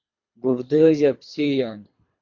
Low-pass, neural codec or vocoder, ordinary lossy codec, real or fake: 7.2 kHz; codec, 24 kHz, 3 kbps, HILCodec; MP3, 48 kbps; fake